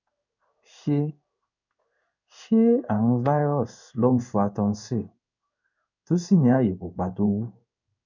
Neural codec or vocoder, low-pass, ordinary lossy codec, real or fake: codec, 16 kHz in and 24 kHz out, 1 kbps, XY-Tokenizer; 7.2 kHz; none; fake